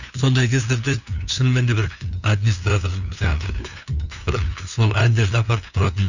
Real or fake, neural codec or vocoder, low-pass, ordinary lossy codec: fake; codec, 16 kHz, 2 kbps, FunCodec, trained on LibriTTS, 25 frames a second; 7.2 kHz; none